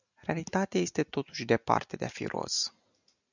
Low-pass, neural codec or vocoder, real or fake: 7.2 kHz; none; real